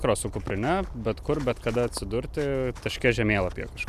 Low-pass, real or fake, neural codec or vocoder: 14.4 kHz; real; none